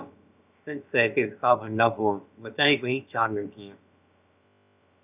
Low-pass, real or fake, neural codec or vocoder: 3.6 kHz; fake; codec, 16 kHz, about 1 kbps, DyCAST, with the encoder's durations